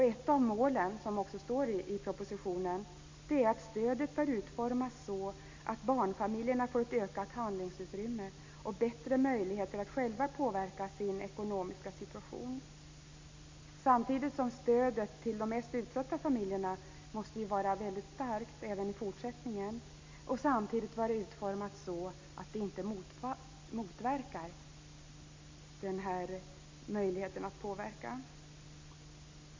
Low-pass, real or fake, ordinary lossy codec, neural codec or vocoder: 7.2 kHz; real; MP3, 64 kbps; none